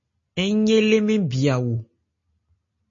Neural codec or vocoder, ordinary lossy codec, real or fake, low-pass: none; MP3, 32 kbps; real; 7.2 kHz